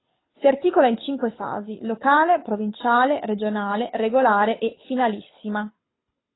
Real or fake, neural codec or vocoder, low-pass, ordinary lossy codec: fake; codec, 24 kHz, 6 kbps, HILCodec; 7.2 kHz; AAC, 16 kbps